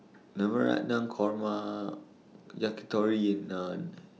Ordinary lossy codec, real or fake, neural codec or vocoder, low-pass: none; real; none; none